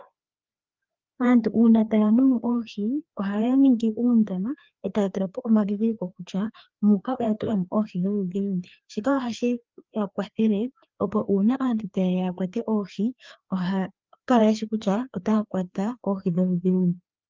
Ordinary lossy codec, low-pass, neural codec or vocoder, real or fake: Opus, 24 kbps; 7.2 kHz; codec, 16 kHz, 2 kbps, FreqCodec, larger model; fake